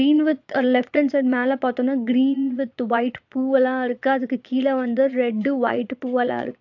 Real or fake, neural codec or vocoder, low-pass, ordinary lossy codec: fake; vocoder, 22.05 kHz, 80 mel bands, Vocos; 7.2 kHz; AAC, 48 kbps